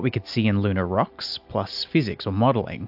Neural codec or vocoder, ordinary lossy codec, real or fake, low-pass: none; AAC, 48 kbps; real; 5.4 kHz